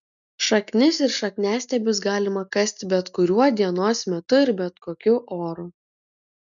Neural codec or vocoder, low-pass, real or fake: none; 7.2 kHz; real